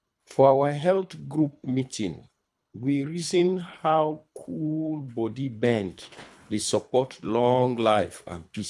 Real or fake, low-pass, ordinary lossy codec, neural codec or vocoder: fake; none; none; codec, 24 kHz, 3 kbps, HILCodec